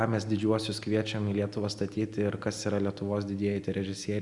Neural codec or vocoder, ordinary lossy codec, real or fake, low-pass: none; Opus, 64 kbps; real; 10.8 kHz